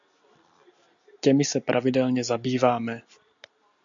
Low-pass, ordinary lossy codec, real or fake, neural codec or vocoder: 7.2 kHz; AAC, 64 kbps; real; none